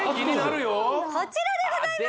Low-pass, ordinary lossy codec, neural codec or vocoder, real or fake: none; none; none; real